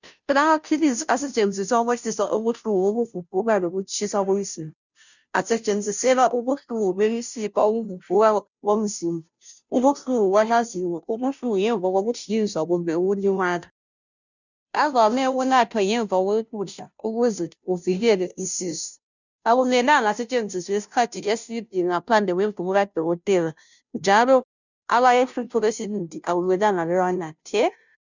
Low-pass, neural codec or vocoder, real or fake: 7.2 kHz; codec, 16 kHz, 0.5 kbps, FunCodec, trained on Chinese and English, 25 frames a second; fake